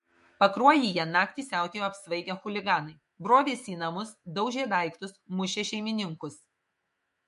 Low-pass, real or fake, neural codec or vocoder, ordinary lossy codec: 14.4 kHz; fake; autoencoder, 48 kHz, 128 numbers a frame, DAC-VAE, trained on Japanese speech; MP3, 48 kbps